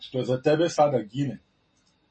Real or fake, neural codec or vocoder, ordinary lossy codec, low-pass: real; none; MP3, 32 kbps; 9.9 kHz